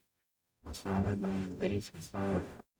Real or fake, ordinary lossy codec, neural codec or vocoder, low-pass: fake; none; codec, 44.1 kHz, 0.9 kbps, DAC; none